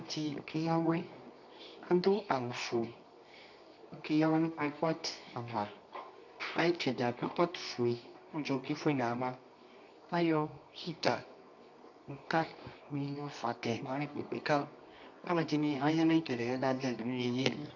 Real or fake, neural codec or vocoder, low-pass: fake; codec, 24 kHz, 0.9 kbps, WavTokenizer, medium music audio release; 7.2 kHz